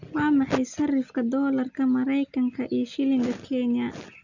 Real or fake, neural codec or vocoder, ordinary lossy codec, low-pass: real; none; none; 7.2 kHz